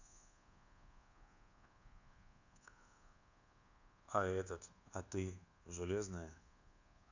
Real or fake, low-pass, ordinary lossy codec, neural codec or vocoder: fake; 7.2 kHz; none; codec, 24 kHz, 1.2 kbps, DualCodec